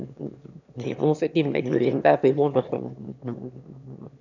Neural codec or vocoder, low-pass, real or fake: autoencoder, 22.05 kHz, a latent of 192 numbers a frame, VITS, trained on one speaker; 7.2 kHz; fake